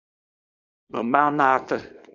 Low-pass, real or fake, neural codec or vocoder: 7.2 kHz; fake; codec, 24 kHz, 0.9 kbps, WavTokenizer, small release